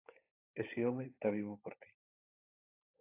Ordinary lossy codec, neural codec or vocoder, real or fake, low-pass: AAC, 24 kbps; codec, 16 kHz, 16 kbps, FunCodec, trained on LibriTTS, 50 frames a second; fake; 3.6 kHz